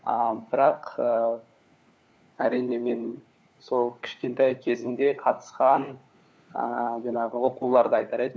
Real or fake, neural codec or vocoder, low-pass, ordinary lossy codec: fake; codec, 16 kHz, 4 kbps, FunCodec, trained on LibriTTS, 50 frames a second; none; none